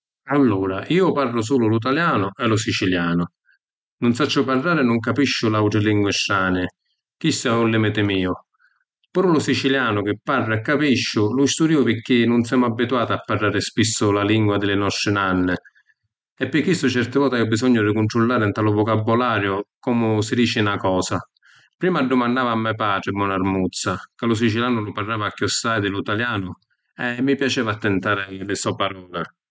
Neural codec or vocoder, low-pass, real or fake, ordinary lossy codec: none; none; real; none